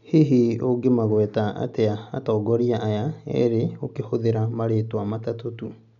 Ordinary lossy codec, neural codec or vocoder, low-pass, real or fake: none; none; 7.2 kHz; real